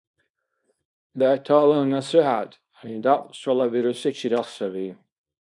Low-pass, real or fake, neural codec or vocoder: 10.8 kHz; fake; codec, 24 kHz, 0.9 kbps, WavTokenizer, small release